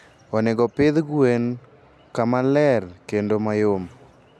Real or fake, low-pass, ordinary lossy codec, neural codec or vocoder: real; none; none; none